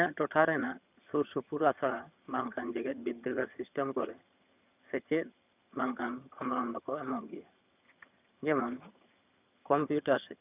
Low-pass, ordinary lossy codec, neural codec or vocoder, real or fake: 3.6 kHz; none; vocoder, 22.05 kHz, 80 mel bands, HiFi-GAN; fake